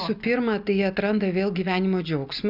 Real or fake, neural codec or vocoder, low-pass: real; none; 5.4 kHz